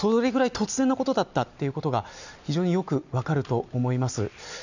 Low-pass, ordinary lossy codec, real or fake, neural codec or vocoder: 7.2 kHz; none; real; none